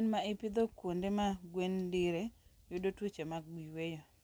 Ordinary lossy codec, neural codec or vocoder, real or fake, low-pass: none; none; real; none